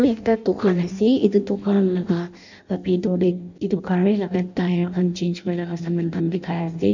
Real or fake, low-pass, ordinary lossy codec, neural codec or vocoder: fake; 7.2 kHz; none; codec, 16 kHz in and 24 kHz out, 0.6 kbps, FireRedTTS-2 codec